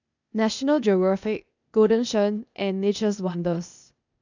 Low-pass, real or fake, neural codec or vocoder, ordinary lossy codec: 7.2 kHz; fake; codec, 16 kHz, 0.8 kbps, ZipCodec; none